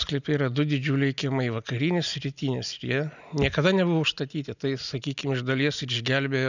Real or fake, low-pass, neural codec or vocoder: real; 7.2 kHz; none